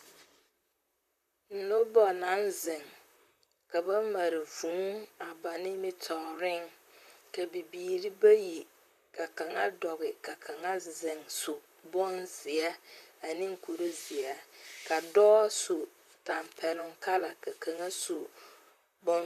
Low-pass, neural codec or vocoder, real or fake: 14.4 kHz; vocoder, 44.1 kHz, 128 mel bands, Pupu-Vocoder; fake